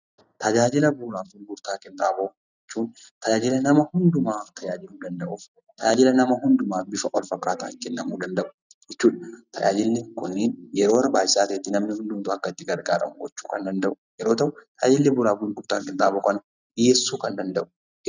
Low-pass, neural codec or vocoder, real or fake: 7.2 kHz; none; real